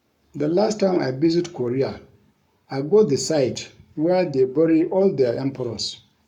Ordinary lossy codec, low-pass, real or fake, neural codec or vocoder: none; 19.8 kHz; fake; codec, 44.1 kHz, 7.8 kbps, Pupu-Codec